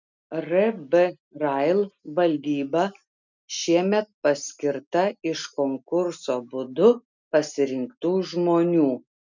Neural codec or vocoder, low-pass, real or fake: none; 7.2 kHz; real